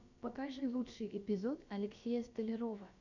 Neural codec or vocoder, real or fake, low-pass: codec, 16 kHz, about 1 kbps, DyCAST, with the encoder's durations; fake; 7.2 kHz